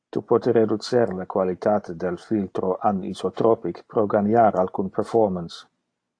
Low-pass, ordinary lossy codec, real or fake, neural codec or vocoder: 9.9 kHz; AAC, 48 kbps; real; none